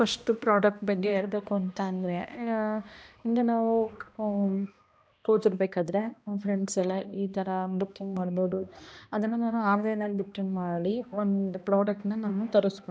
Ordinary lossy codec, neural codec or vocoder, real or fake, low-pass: none; codec, 16 kHz, 1 kbps, X-Codec, HuBERT features, trained on balanced general audio; fake; none